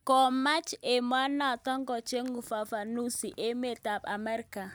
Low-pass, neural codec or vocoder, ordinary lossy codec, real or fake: none; none; none; real